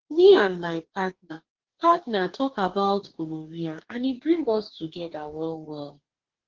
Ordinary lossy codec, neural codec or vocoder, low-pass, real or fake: Opus, 16 kbps; codec, 44.1 kHz, 2.6 kbps, DAC; 7.2 kHz; fake